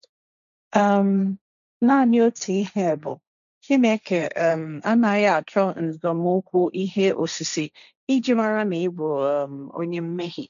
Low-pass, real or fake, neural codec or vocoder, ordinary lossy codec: 7.2 kHz; fake; codec, 16 kHz, 1.1 kbps, Voila-Tokenizer; none